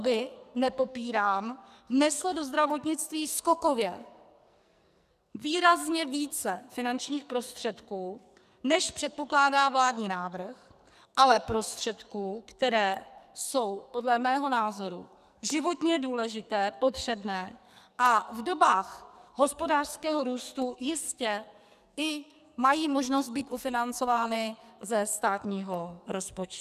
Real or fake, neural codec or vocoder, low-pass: fake; codec, 44.1 kHz, 2.6 kbps, SNAC; 14.4 kHz